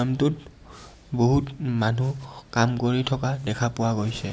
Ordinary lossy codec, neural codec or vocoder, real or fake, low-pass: none; none; real; none